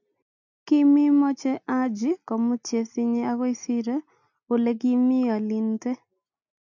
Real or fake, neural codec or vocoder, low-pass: real; none; 7.2 kHz